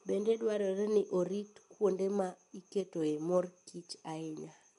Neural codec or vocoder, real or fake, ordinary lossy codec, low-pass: none; real; MP3, 64 kbps; 10.8 kHz